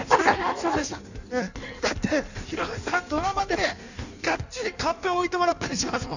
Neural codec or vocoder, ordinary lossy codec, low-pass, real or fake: codec, 16 kHz in and 24 kHz out, 1.1 kbps, FireRedTTS-2 codec; none; 7.2 kHz; fake